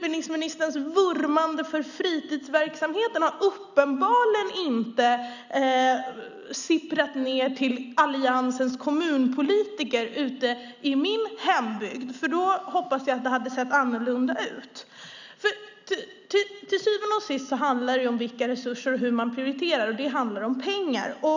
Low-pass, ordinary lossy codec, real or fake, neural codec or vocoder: 7.2 kHz; none; real; none